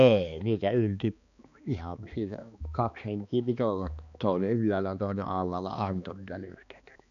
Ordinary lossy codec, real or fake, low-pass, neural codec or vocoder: none; fake; 7.2 kHz; codec, 16 kHz, 2 kbps, X-Codec, HuBERT features, trained on balanced general audio